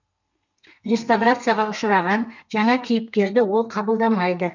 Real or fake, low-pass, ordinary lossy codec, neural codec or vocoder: fake; 7.2 kHz; none; codec, 32 kHz, 1.9 kbps, SNAC